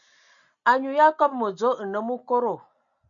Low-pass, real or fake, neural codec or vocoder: 7.2 kHz; real; none